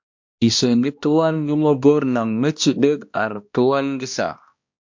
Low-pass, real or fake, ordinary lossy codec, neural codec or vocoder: 7.2 kHz; fake; MP3, 48 kbps; codec, 16 kHz, 1 kbps, X-Codec, HuBERT features, trained on balanced general audio